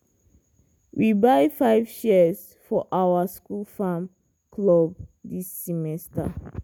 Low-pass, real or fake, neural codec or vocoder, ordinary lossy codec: none; real; none; none